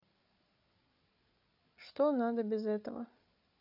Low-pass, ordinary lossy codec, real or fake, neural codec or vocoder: 5.4 kHz; none; fake; codec, 16 kHz, 8 kbps, FreqCodec, larger model